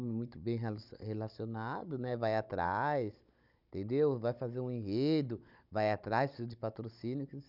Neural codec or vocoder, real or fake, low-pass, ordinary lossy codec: codec, 16 kHz, 16 kbps, FunCodec, trained on Chinese and English, 50 frames a second; fake; 5.4 kHz; none